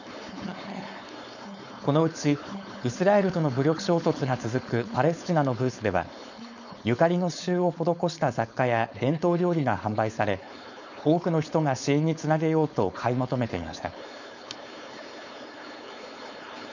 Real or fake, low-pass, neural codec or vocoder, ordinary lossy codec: fake; 7.2 kHz; codec, 16 kHz, 4.8 kbps, FACodec; none